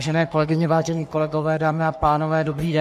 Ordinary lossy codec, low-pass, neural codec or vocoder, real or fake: MP3, 64 kbps; 14.4 kHz; codec, 44.1 kHz, 3.4 kbps, Pupu-Codec; fake